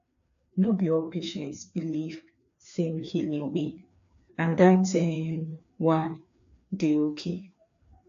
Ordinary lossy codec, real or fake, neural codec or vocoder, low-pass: AAC, 64 kbps; fake; codec, 16 kHz, 2 kbps, FreqCodec, larger model; 7.2 kHz